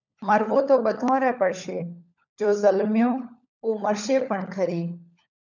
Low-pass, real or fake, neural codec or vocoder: 7.2 kHz; fake; codec, 16 kHz, 16 kbps, FunCodec, trained on LibriTTS, 50 frames a second